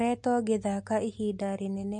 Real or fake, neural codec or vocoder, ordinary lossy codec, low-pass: real; none; MP3, 48 kbps; 9.9 kHz